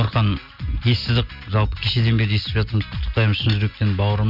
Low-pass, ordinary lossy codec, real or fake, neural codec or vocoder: 5.4 kHz; none; real; none